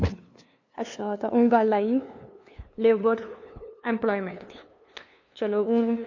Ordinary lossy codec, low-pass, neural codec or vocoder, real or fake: AAC, 48 kbps; 7.2 kHz; codec, 16 kHz, 2 kbps, FunCodec, trained on LibriTTS, 25 frames a second; fake